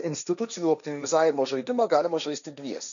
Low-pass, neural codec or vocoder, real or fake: 7.2 kHz; codec, 16 kHz, 1.1 kbps, Voila-Tokenizer; fake